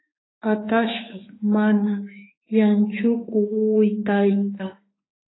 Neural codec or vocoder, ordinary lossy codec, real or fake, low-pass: autoencoder, 48 kHz, 32 numbers a frame, DAC-VAE, trained on Japanese speech; AAC, 16 kbps; fake; 7.2 kHz